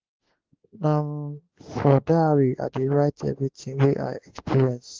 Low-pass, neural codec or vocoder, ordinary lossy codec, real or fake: 7.2 kHz; autoencoder, 48 kHz, 32 numbers a frame, DAC-VAE, trained on Japanese speech; Opus, 16 kbps; fake